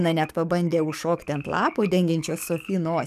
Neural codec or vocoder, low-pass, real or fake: codec, 44.1 kHz, 7.8 kbps, DAC; 14.4 kHz; fake